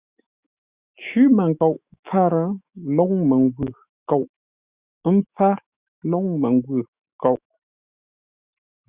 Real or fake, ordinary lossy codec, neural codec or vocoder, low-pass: fake; Opus, 64 kbps; autoencoder, 48 kHz, 128 numbers a frame, DAC-VAE, trained on Japanese speech; 3.6 kHz